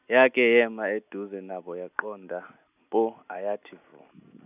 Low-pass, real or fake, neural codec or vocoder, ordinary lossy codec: 3.6 kHz; real; none; none